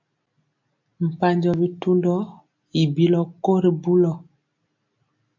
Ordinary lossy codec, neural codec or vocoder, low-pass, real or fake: AAC, 48 kbps; none; 7.2 kHz; real